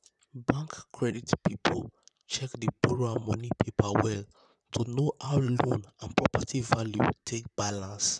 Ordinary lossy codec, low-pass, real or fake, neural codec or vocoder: none; 10.8 kHz; fake; vocoder, 44.1 kHz, 128 mel bands, Pupu-Vocoder